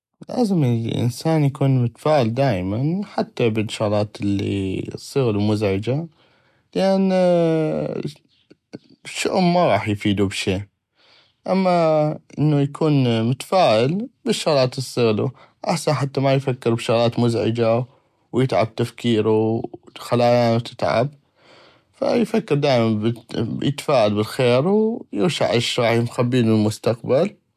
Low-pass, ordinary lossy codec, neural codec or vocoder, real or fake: 14.4 kHz; none; none; real